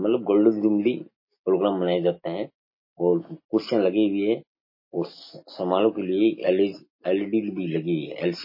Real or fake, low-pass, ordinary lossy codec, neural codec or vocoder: real; 5.4 kHz; MP3, 24 kbps; none